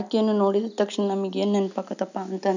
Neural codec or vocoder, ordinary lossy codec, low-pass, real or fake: none; none; 7.2 kHz; real